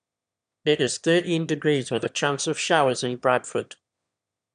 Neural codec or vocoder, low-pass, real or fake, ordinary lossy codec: autoencoder, 22.05 kHz, a latent of 192 numbers a frame, VITS, trained on one speaker; 9.9 kHz; fake; none